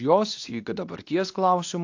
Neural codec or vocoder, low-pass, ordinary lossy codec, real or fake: codec, 24 kHz, 0.9 kbps, WavTokenizer, medium speech release version 2; 7.2 kHz; AAC, 48 kbps; fake